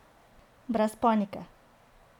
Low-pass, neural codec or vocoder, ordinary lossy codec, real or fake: 19.8 kHz; none; none; real